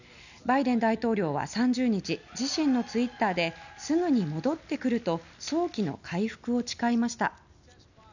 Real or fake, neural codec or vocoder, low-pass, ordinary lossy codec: real; none; 7.2 kHz; none